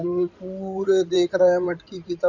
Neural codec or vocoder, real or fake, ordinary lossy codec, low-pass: codec, 16 kHz in and 24 kHz out, 2.2 kbps, FireRedTTS-2 codec; fake; none; 7.2 kHz